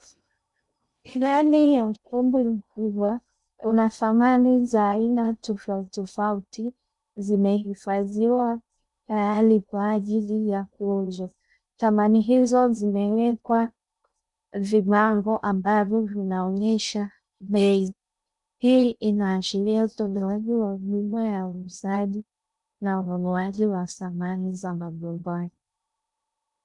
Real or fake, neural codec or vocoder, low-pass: fake; codec, 16 kHz in and 24 kHz out, 0.6 kbps, FocalCodec, streaming, 2048 codes; 10.8 kHz